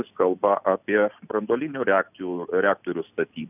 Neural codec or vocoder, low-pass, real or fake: none; 3.6 kHz; real